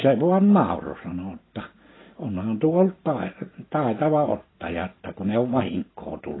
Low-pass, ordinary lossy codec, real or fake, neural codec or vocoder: 7.2 kHz; AAC, 16 kbps; real; none